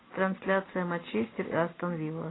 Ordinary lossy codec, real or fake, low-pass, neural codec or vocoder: AAC, 16 kbps; real; 7.2 kHz; none